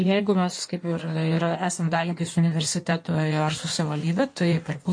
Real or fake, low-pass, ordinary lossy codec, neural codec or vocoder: fake; 9.9 kHz; MP3, 48 kbps; codec, 16 kHz in and 24 kHz out, 1.1 kbps, FireRedTTS-2 codec